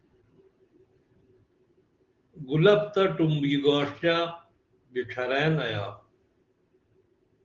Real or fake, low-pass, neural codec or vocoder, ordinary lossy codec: real; 7.2 kHz; none; Opus, 16 kbps